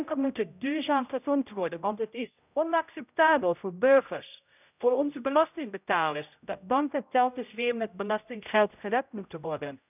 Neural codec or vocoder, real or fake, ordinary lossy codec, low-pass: codec, 16 kHz, 0.5 kbps, X-Codec, HuBERT features, trained on general audio; fake; none; 3.6 kHz